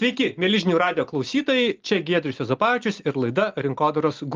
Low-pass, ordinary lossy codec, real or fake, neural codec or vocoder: 7.2 kHz; Opus, 32 kbps; real; none